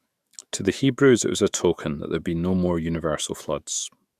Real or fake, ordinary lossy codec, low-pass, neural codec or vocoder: fake; Opus, 64 kbps; 14.4 kHz; autoencoder, 48 kHz, 128 numbers a frame, DAC-VAE, trained on Japanese speech